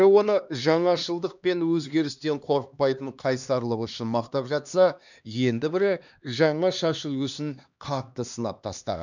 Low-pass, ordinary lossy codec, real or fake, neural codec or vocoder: 7.2 kHz; none; fake; codec, 16 kHz, 2 kbps, X-Codec, HuBERT features, trained on LibriSpeech